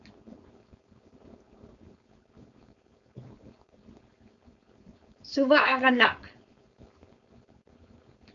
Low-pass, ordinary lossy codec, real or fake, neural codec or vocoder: 7.2 kHz; AAC, 64 kbps; fake; codec, 16 kHz, 4.8 kbps, FACodec